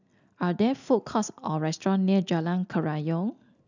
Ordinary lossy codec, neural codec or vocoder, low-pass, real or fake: none; none; 7.2 kHz; real